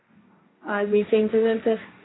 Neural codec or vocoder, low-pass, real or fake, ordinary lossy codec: codec, 16 kHz, 1.1 kbps, Voila-Tokenizer; 7.2 kHz; fake; AAC, 16 kbps